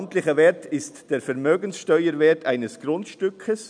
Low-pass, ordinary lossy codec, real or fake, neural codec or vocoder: 9.9 kHz; none; real; none